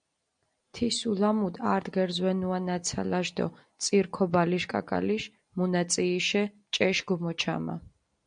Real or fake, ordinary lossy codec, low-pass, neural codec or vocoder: real; MP3, 96 kbps; 9.9 kHz; none